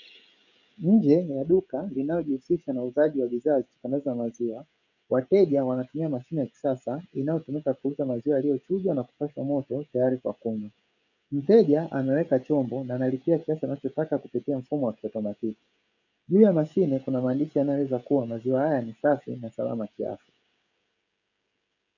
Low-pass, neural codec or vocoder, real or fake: 7.2 kHz; codec, 16 kHz, 16 kbps, FreqCodec, smaller model; fake